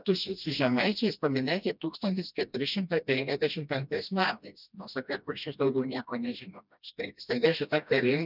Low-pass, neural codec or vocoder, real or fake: 5.4 kHz; codec, 16 kHz, 1 kbps, FreqCodec, smaller model; fake